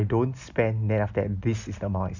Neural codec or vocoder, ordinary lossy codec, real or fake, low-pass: none; none; real; 7.2 kHz